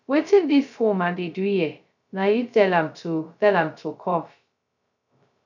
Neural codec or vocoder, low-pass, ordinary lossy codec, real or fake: codec, 16 kHz, 0.2 kbps, FocalCodec; 7.2 kHz; none; fake